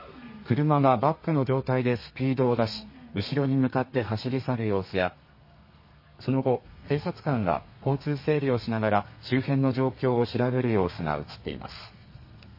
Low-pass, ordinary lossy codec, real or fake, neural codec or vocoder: 5.4 kHz; MP3, 24 kbps; fake; codec, 32 kHz, 1.9 kbps, SNAC